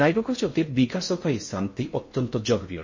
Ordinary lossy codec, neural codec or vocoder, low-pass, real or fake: MP3, 32 kbps; codec, 16 kHz in and 24 kHz out, 0.6 kbps, FocalCodec, streaming, 4096 codes; 7.2 kHz; fake